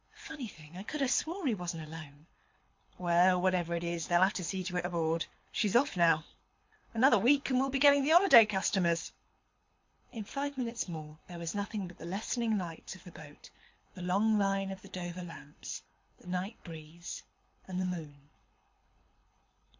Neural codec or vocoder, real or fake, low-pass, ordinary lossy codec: codec, 24 kHz, 6 kbps, HILCodec; fake; 7.2 kHz; MP3, 48 kbps